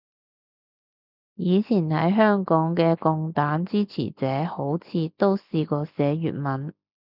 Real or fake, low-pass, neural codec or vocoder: real; 5.4 kHz; none